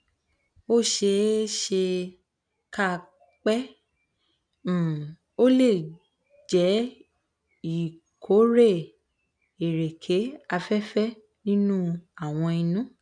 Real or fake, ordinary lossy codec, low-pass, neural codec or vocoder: real; none; 9.9 kHz; none